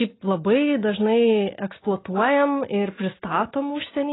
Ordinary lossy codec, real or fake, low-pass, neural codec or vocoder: AAC, 16 kbps; real; 7.2 kHz; none